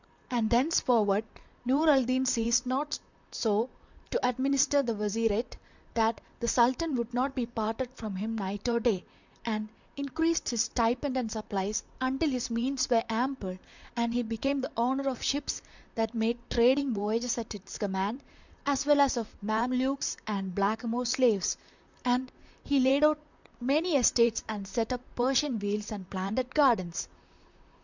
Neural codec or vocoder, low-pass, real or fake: vocoder, 44.1 kHz, 128 mel bands, Pupu-Vocoder; 7.2 kHz; fake